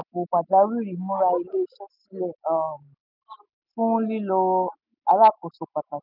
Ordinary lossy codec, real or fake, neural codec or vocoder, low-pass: none; real; none; 5.4 kHz